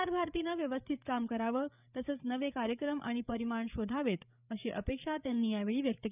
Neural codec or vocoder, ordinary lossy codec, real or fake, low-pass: codec, 16 kHz, 8 kbps, FreqCodec, larger model; none; fake; 3.6 kHz